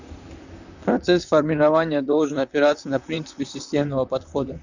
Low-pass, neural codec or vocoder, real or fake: 7.2 kHz; vocoder, 44.1 kHz, 128 mel bands, Pupu-Vocoder; fake